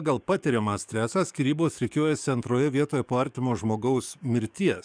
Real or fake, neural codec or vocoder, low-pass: fake; codec, 44.1 kHz, 7.8 kbps, DAC; 9.9 kHz